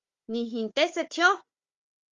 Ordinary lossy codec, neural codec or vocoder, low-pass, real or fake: Opus, 32 kbps; codec, 16 kHz, 4 kbps, FunCodec, trained on Chinese and English, 50 frames a second; 7.2 kHz; fake